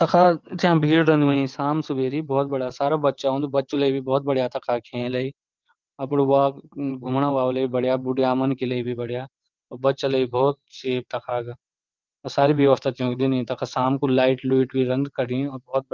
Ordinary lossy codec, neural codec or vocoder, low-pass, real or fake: Opus, 24 kbps; vocoder, 22.05 kHz, 80 mel bands, WaveNeXt; 7.2 kHz; fake